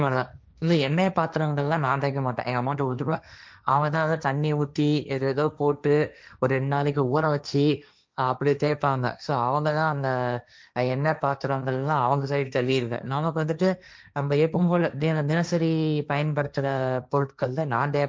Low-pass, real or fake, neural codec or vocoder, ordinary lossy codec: none; fake; codec, 16 kHz, 1.1 kbps, Voila-Tokenizer; none